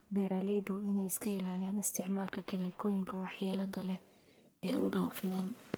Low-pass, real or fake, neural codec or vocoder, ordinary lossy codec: none; fake; codec, 44.1 kHz, 1.7 kbps, Pupu-Codec; none